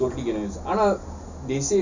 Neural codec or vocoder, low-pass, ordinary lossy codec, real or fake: none; 7.2 kHz; none; real